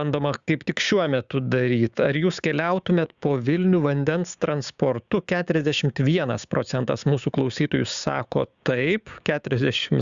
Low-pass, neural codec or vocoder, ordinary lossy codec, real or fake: 7.2 kHz; codec, 16 kHz, 6 kbps, DAC; Opus, 64 kbps; fake